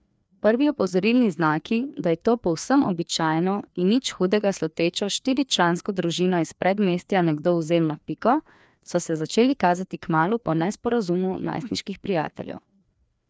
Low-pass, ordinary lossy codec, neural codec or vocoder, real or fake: none; none; codec, 16 kHz, 2 kbps, FreqCodec, larger model; fake